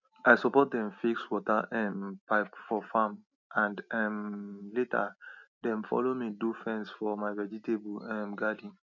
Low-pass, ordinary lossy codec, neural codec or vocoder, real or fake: 7.2 kHz; none; none; real